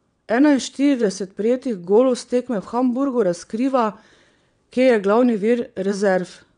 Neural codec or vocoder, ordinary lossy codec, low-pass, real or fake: vocoder, 22.05 kHz, 80 mel bands, WaveNeXt; none; 9.9 kHz; fake